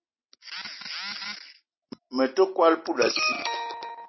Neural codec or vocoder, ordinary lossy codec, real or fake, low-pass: none; MP3, 24 kbps; real; 7.2 kHz